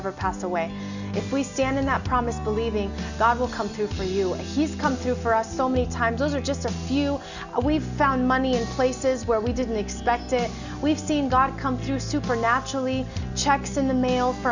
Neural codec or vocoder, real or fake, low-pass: none; real; 7.2 kHz